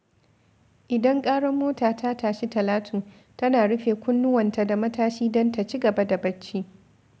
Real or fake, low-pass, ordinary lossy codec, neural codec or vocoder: real; none; none; none